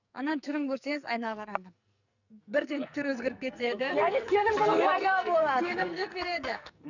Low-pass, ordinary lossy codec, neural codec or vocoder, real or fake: 7.2 kHz; none; codec, 44.1 kHz, 2.6 kbps, SNAC; fake